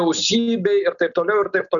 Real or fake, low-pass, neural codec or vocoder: real; 7.2 kHz; none